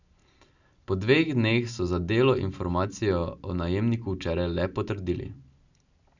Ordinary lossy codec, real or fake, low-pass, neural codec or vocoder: none; real; 7.2 kHz; none